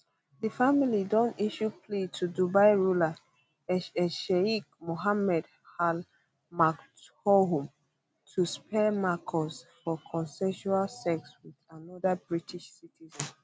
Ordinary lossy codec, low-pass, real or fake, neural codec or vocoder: none; none; real; none